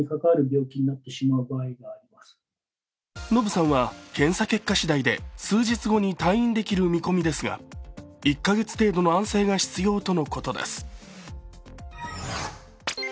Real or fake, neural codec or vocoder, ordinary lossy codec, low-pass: real; none; none; none